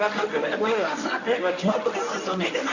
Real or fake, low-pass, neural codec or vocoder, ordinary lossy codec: fake; 7.2 kHz; codec, 24 kHz, 0.9 kbps, WavTokenizer, medium speech release version 1; none